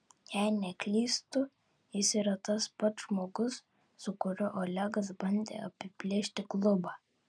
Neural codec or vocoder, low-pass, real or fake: none; 9.9 kHz; real